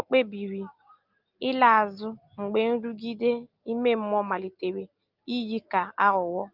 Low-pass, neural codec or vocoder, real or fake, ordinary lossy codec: 5.4 kHz; none; real; Opus, 32 kbps